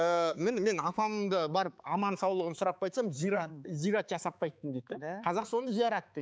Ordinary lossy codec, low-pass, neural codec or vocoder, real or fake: none; none; codec, 16 kHz, 4 kbps, X-Codec, HuBERT features, trained on balanced general audio; fake